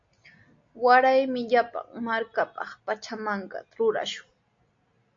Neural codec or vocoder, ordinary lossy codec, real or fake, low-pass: none; MP3, 48 kbps; real; 7.2 kHz